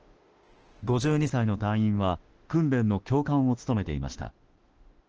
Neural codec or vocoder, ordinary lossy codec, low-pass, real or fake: autoencoder, 48 kHz, 32 numbers a frame, DAC-VAE, trained on Japanese speech; Opus, 16 kbps; 7.2 kHz; fake